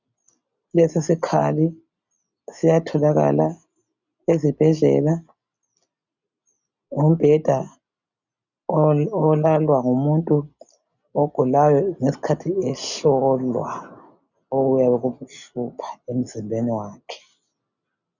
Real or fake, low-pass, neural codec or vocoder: real; 7.2 kHz; none